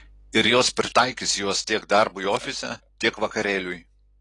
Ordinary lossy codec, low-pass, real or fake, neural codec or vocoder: AAC, 32 kbps; 10.8 kHz; real; none